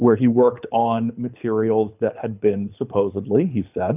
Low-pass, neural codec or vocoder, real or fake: 3.6 kHz; codec, 24 kHz, 6 kbps, HILCodec; fake